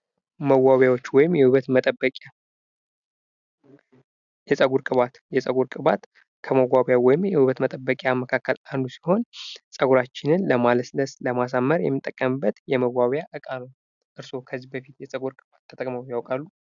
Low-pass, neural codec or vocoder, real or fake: 7.2 kHz; none; real